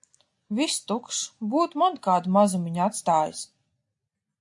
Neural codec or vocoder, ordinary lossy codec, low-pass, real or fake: none; AAC, 64 kbps; 10.8 kHz; real